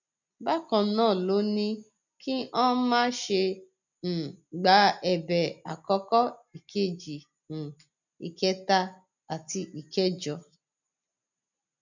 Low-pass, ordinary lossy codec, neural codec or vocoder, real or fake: 7.2 kHz; none; none; real